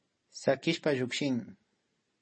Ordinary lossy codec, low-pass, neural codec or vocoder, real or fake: MP3, 32 kbps; 9.9 kHz; none; real